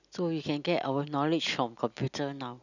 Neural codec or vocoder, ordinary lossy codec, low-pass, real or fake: none; none; 7.2 kHz; real